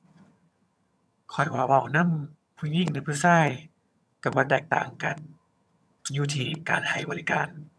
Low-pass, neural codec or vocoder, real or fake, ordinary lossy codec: none; vocoder, 22.05 kHz, 80 mel bands, HiFi-GAN; fake; none